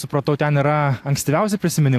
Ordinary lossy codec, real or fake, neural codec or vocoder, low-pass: AAC, 64 kbps; real; none; 14.4 kHz